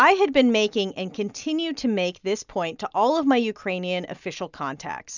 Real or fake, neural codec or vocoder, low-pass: real; none; 7.2 kHz